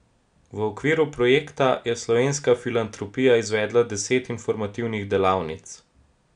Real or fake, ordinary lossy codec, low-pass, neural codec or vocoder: real; none; 9.9 kHz; none